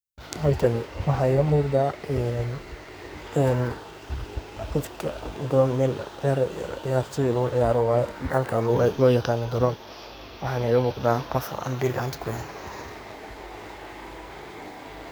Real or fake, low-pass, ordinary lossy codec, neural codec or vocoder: fake; none; none; codec, 44.1 kHz, 2.6 kbps, SNAC